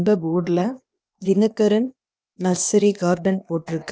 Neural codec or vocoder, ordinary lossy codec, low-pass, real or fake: codec, 16 kHz, 0.8 kbps, ZipCodec; none; none; fake